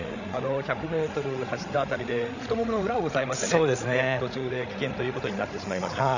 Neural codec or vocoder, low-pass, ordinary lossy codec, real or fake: codec, 16 kHz, 16 kbps, FreqCodec, larger model; 7.2 kHz; none; fake